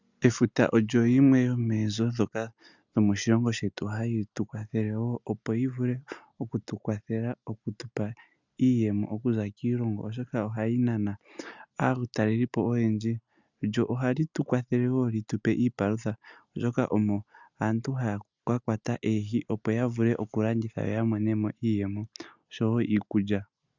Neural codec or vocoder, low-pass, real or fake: none; 7.2 kHz; real